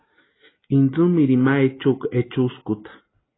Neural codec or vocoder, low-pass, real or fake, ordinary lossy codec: none; 7.2 kHz; real; AAC, 16 kbps